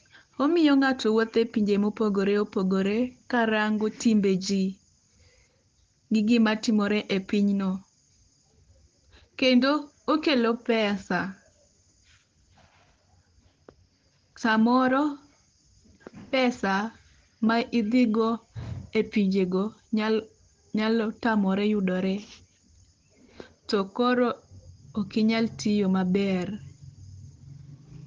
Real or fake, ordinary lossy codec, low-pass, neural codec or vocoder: real; Opus, 16 kbps; 7.2 kHz; none